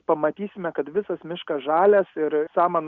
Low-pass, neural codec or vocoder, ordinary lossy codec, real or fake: 7.2 kHz; none; Opus, 64 kbps; real